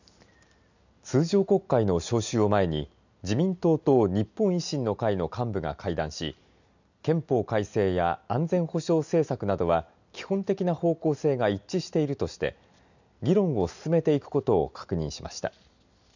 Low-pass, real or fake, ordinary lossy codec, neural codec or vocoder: 7.2 kHz; real; none; none